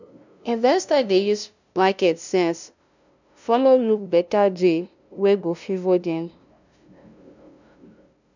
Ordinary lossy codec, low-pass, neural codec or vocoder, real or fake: none; 7.2 kHz; codec, 16 kHz, 0.5 kbps, FunCodec, trained on LibriTTS, 25 frames a second; fake